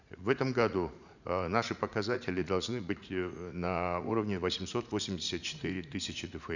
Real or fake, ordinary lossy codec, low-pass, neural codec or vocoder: fake; none; 7.2 kHz; vocoder, 44.1 kHz, 80 mel bands, Vocos